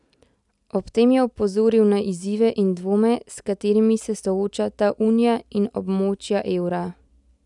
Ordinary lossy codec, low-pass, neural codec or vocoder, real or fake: none; 10.8 kHz; none; real